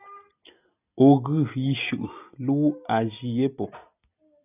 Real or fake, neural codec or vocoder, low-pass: real; none; 3.6 kHz